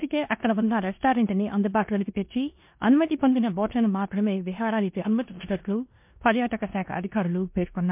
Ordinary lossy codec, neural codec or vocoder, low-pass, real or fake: MP3, 32 kbps; codec, 16 kHz in and 24 kHz out, 0.9 kbps, LongCat-Audio-Codec, four codebook decoder; 3.6 kHz; fake